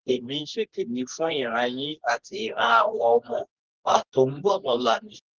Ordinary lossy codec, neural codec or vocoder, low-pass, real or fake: Opus, 16 kbps; codec, 24 kHz, 0.9 kbps, WavTokenizer, medium music audio release; 7.2 kHz; fake